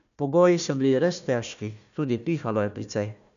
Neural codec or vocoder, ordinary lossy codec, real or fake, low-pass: codec, 16 kHz, 1 kbps, FunCodec, trained on Chinese and English, 50 frames a second; none; fake; 7.2 kHz